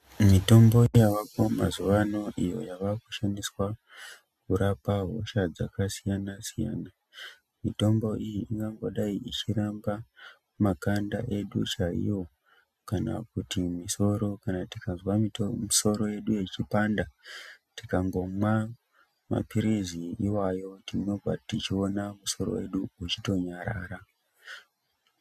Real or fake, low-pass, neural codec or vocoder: real; 14.4 kHz; none